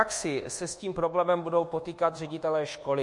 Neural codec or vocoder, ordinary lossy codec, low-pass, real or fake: codec, 24 kHz, 1.2 kbps, DualCodec; MP3, 48 kbps; 10.8 kHz; fake